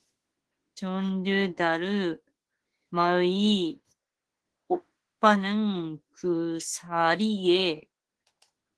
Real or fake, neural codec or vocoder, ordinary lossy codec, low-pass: fake; autoencoder, 48 kHz, 32 numbers a frame, DAC-VAE, trained on Japanese speech; Opus, 16 kbps; 10.8 kHz